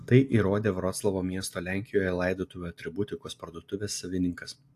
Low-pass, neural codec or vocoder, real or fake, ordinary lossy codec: 14.4 kHz; none; real; AAC, 64 kbps